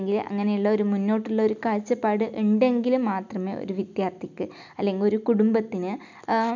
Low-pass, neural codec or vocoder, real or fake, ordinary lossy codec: 7.2 kHz; none; real; none